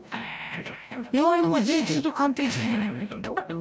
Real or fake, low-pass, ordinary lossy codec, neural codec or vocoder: fake; none; none; codec, 16 kHz, 0.5 kbps, FreqCodec, larger model